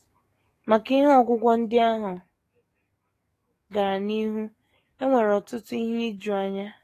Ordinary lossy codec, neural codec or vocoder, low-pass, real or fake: AAC, 48 kbps; codec, 44.1 kHz, 7.8 kbps, DAC; 14.4 kHz; fake